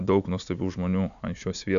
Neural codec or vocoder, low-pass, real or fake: none; 7.2 kHz; real